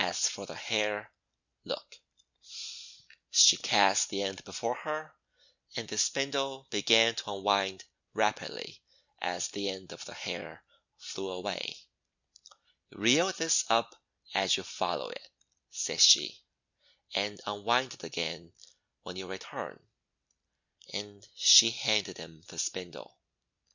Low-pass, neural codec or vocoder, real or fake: 7.2 kHz; none; real